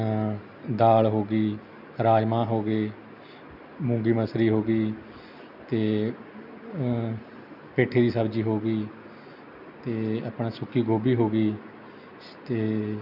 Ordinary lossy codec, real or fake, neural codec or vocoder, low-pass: none; real; none; 5.4 kHz